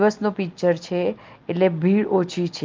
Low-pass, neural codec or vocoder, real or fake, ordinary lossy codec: 7.2 kHz; none; real; Opus, 32 kbps